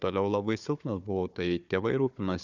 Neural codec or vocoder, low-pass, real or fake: codec, 16 kHz, 4 kbps, FunCodec, trained on Chinese and English, 50 frames a second; 7.2 kHz; fake